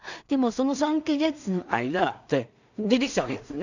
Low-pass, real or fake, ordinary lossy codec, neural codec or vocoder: 7.2 kHz; fake; none; codec, 16 kHz in and 24 kHz out, 0.4 kbps, LongCat-Audio-Codec, two codebook decoder